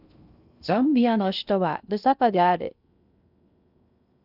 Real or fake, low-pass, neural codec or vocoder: fake; 5.4 kHz; codec, 16 kHz in and 24 kHz out, 0.6 kbps, FocalCodec, streaming, 2048 codes